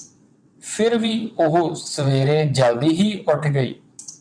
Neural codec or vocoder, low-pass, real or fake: vocoder, 22.05 kHz, 80 mel bands, WaveNeXt; 9.9 kHz; fake